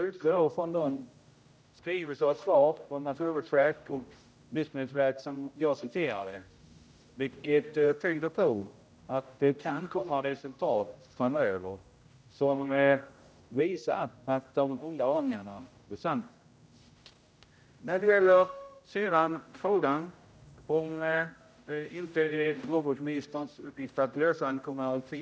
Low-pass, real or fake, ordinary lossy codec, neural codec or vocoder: none; fake; none; codec, 16 kHz, 0.5 kbps, X-Codec, HuBERT features, trained on general audio